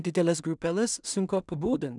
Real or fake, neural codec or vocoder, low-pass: fake; codec, 16 kHz in and 24 kHz out, 0.4 kbps, LongCat-Audio-Codec, two codebook decoder; 10.8 kHz